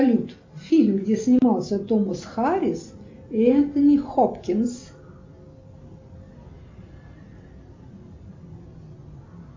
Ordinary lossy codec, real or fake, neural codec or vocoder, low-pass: MP3, 48 kbps; real; none; 7.2 kHz